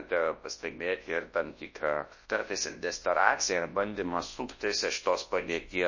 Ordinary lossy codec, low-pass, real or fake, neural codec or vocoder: MP3, 32 kbps; 7.2 kHz; fake; codec, 24 kHz, 0.9 kbps, WavTokenizer, large speech release